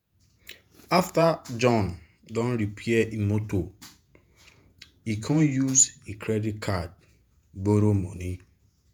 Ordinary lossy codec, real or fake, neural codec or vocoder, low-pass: none; fake; vocoder, 48 kHz, 128 mel bands, Vocos; none